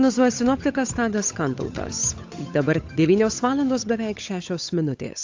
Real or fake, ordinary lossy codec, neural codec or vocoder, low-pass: fake; AAC, 48 kbps; codec, 16 kHz, 8 kbps, FunCodec, trained on Chinese and English, 25 frames a second; 7.2 kHz